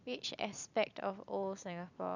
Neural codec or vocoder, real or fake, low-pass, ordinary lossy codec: vocoder, 44.1 kHz, 128 mel bands every 256 samples, BigVGAN v2; fake; 7.2 kHz; none